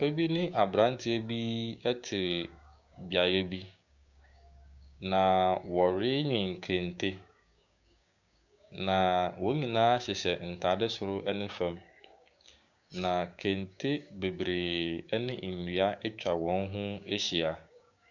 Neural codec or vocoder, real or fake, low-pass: codec, 16 kHz, 6 kbps, DAC; fake; 7.2 kHz